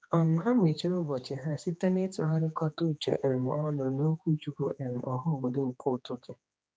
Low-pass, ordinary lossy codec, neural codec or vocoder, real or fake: none; none; codec, 16 kHz, 2 kbps, X-Codec, HuBERT features, trained on general audio; fake